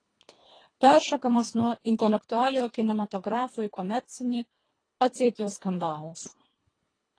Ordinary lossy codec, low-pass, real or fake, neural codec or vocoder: AAC, 32 kbps; 9.9 kHz; fake; codec, 24 kHz, 1.5 kbps, HILCodec